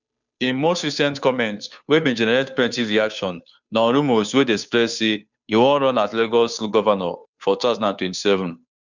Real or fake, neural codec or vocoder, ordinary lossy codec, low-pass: fake; codec, 16 kHz, 2 kbps, FunCodec, trained on Chinese and English, 25 frames a second; none; 7.2 kHz